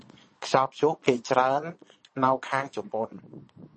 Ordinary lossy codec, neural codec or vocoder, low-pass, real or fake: MP3, 32 kbps; vocoder, 22.05 kHz, 80 mel bands, Vocos; 9.9 kHz; fake